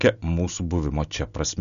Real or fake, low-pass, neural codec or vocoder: real; 7.2 kHz; none